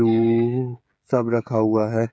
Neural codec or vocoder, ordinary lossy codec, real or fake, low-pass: codec, 16 kHz, 16 kbps, FreqCodec, smaller model; none; fake; none